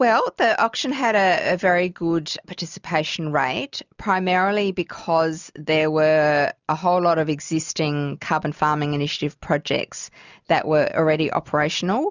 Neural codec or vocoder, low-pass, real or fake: none; 7.2 kHz; real